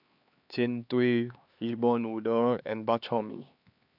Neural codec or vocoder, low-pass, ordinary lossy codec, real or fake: codec, 16 kHz, 2 kbps, X-Codec, HuBERT features, trained on LibriSpeech; 5.4 kHz; AAC, 48 kbps; fake